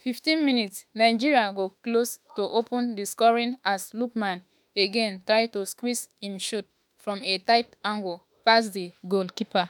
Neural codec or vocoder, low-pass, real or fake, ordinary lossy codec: autoencoder, 48 kHz, 32 numbers a frame, DAC-VAE, trained on Japanese speech; none; fake; none